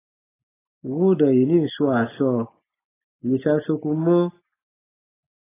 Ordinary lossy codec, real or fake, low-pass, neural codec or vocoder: AAC, 16 kbps; real; 3.6 kHz; none